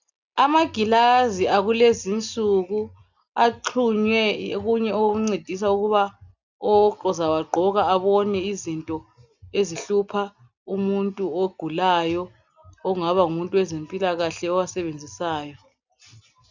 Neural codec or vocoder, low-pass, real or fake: none; 7.2 kHz; real